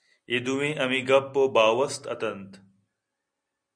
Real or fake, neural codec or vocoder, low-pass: real; none; 9.9 kHz